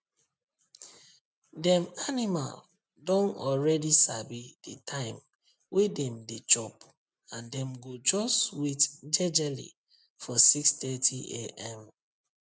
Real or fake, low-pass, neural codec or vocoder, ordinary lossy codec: real; none; none; none